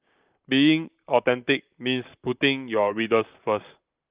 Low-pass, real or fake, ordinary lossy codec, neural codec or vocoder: 3.6 kHz; fake; Opus, 32 kbps; vocoder, 44.1 kHz, 128 mel bands, Pupu-Vocoder